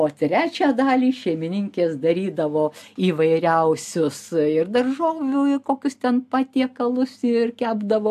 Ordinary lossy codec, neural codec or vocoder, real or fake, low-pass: AAC, 96 kbps; none; real; 14.4 kHz